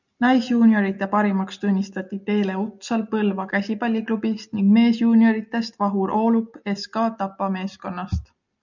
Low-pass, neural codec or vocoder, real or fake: 7.2 kHz; none; real